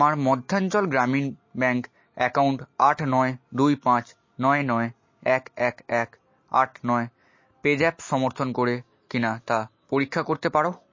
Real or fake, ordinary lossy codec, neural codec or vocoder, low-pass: real; MP3, 32 kbps; none; 7.2 kHz